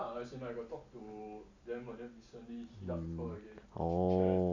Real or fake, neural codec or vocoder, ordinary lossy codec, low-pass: real; none; AAC, 48 kbps; 7.2 kHz